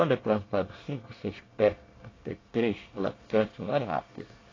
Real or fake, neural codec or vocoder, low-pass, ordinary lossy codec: fake; codec, 24 kHz, 1 kbps, SNAC; 7.2 kHz; AAC, 32 kbps